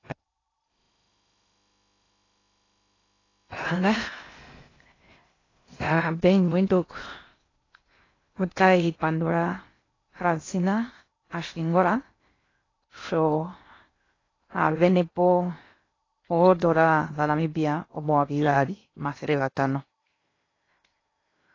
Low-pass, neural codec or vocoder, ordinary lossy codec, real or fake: 7.2 kHz; codec, 16 kHz in and 24 kHz out, 0.6 kbps, FocalCodec, streaming, 2048 codes; AAC, 32 kbps; fake